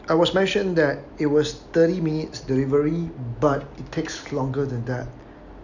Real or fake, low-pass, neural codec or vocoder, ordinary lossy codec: real; 7.2 kHz; none; none